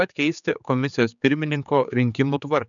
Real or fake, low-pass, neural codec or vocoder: fake; 7.2 kHz; codec, 16 kHz, 4 kbps, X-Codec, HuBERT features, trained on general audio